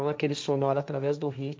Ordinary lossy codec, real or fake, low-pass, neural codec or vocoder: none; fake; none; codec, 16 kHz, 1.1 kbps, Voila-Tokenizer